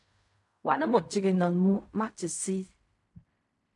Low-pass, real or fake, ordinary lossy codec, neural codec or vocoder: 10.8 kHz; fake; AAC, 64 kbps; codec, 16 kHz in and 24 kHz out, 0.4 kbps, LongCat-Audio-Codec, fine tuned four codebook decoder